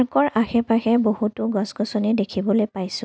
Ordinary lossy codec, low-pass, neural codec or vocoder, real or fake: none; none; none; real